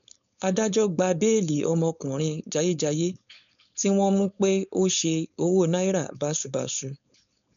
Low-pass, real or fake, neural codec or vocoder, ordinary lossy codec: 7.2 kHz; fake; codec, 16 kHz, 4.8 kbps, FACodec; none